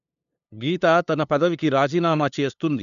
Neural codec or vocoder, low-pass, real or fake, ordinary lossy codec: codec, 16 kHz, 2 kbps, FunCodec, trained on LibriTTS, 25 frames a second; 7.2 kHz; fake; none